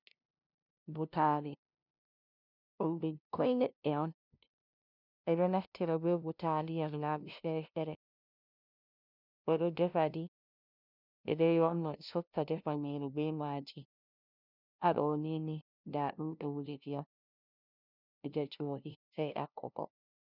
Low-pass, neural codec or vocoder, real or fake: 5.4 kHz; codec, 16 kHz, 0.5 kbps, FunCodec, trained on LibriTTS, 25 frames a second; fake